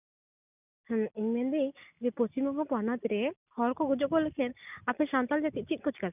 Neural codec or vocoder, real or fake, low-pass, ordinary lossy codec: none; real; 3.6 kHz; none